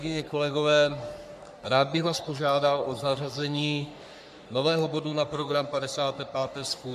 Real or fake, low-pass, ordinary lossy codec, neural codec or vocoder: fake; 14.4 kHz; MP3, 96 kbps; codec, 44.1 kHz, 3.4 kbps, Pupu-Codec